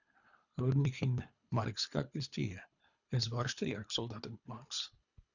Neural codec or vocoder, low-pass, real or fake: codec, 24 kHz, 3 kbps, HILCodec; 7.2 kHz; fake